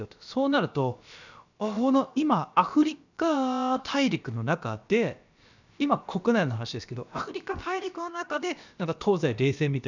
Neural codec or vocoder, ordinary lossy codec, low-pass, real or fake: codec, 16 kHz, about 1 kbps, DyCAST, with the encoder's durations; none; 7.2 kHz; fake